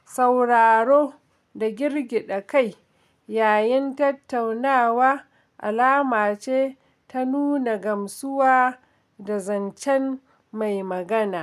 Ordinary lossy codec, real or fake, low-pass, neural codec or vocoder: none; real; 14.4 kHz; none